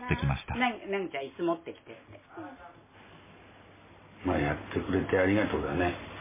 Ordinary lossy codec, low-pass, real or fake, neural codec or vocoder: MP3, 16 kbps; 3.6 kHz; real; none